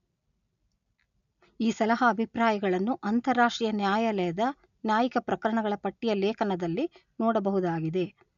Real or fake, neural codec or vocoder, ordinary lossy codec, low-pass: real; none; none; 7.2 kHz